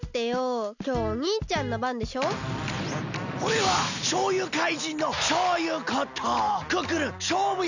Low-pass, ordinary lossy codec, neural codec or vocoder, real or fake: 7.2 kHz; none; none; real